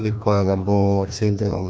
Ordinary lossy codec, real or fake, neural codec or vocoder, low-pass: none; fake; codec, 16 kHz, 1 kbps, FreqCodec, larger model; none